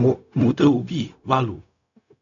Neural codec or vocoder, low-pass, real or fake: codec, 16 kHz, 0.4 kbps, LongCat-Audio-Codec; 7.2 kHz; fake